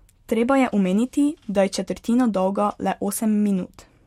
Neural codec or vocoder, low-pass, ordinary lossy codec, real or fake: none; 19.8 kHz; MP3, 64 kbps; real